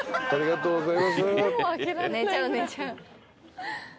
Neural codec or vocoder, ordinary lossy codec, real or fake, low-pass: none; none; real; none